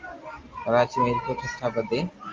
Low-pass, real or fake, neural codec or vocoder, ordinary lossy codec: 7.2 kHz; real; none; Opus, 32 kbps